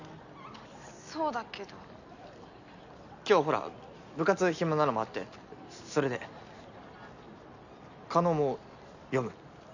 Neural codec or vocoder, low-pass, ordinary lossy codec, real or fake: none; 7.2 kHz; none; real